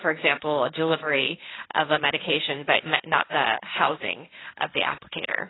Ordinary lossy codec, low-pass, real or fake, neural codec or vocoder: AAC, 16 kbps; 7.2 kHz; fake; codec, 16 kHz, 1.1 kbps, Voila-Tokenizer